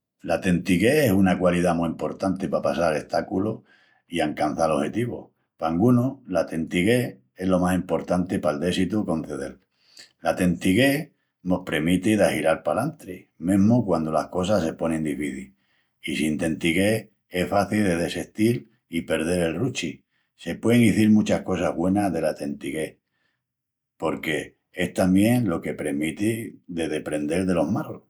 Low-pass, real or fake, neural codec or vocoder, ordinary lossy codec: 19.8 kHz; real; none; none